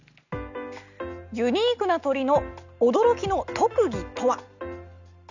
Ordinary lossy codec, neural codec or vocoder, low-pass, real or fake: none; none; 7.2 kHz; real